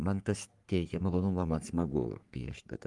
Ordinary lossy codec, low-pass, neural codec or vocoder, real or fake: Opus, 32 kbps; 10.8 kHz; codec, 44.1 kHz, 3.4 kbps, Pupu-Codec; fake